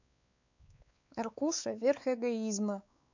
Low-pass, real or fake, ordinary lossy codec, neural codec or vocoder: 7.2 kHz; fake; none; codec, 16 kHz, 4 kbps, X-Codec, WavLM features, trained on Multilingual LibriSpeech